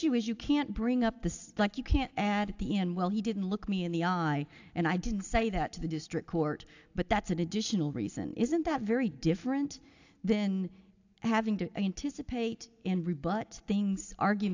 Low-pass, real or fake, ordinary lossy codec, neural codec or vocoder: 7.2 kHz; real; AAC, 48 kbps; none